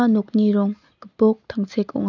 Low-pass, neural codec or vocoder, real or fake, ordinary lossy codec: 7.2 kHz; none; real; none